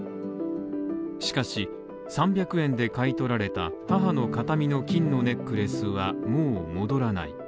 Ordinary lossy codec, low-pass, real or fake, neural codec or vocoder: none; none; real; none